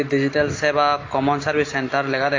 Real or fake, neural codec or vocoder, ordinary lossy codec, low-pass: real; none; AAC, 32 kbps; 7.2 kHz